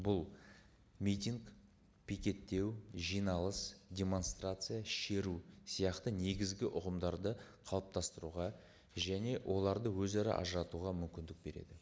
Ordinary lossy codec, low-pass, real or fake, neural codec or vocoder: none; none; real; none